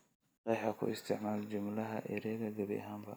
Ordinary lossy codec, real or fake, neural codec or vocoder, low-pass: none; real; none; none